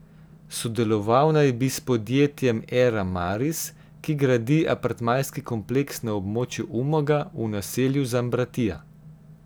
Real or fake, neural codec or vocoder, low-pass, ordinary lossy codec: real; none; none; none